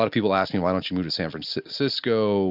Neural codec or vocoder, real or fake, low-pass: none; real; 5.4 kHz